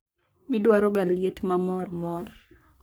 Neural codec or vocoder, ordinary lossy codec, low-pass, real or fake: codec, 44.1 kHz, 3.4 kbps, Pupu-Codec; none; none; fake